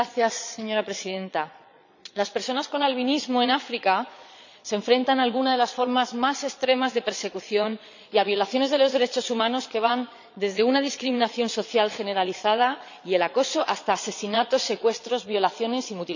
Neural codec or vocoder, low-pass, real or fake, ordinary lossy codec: vocoder, 22.05 kHz, 80 mel bands, Vocos; 7.2 kHz; fake; none